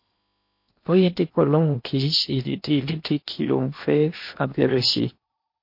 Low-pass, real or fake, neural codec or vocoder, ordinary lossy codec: 5.4 kHz; fake; codec, 16 kHz in and 24 kHz out, 0.8 kbps, FocalCodec, streaming, 65536 codes; MP3, 32 kbps